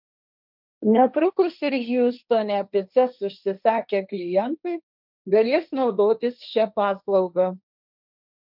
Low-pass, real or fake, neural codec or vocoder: 5.4 kHz; fake; codec, 16 kHz, 1.1 kbps, Voila-Tokenizer